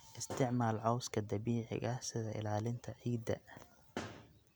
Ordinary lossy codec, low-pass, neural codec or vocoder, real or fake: none; none; none; real